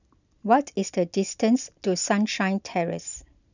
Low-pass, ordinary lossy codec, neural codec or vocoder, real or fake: 7.2 kHz; none; none; real